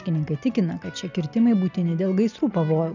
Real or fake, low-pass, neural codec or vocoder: real; 7.2 kHz; none